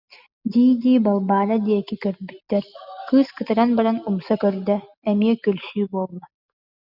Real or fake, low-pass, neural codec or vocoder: real; 5.4 kHz; none